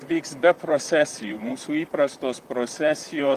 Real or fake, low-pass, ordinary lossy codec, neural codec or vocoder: fake; 14.4 kHz; Opus, 32 kbps; vocoder, 44.1 kHz, 128 mel bands, Pupu-Vocoder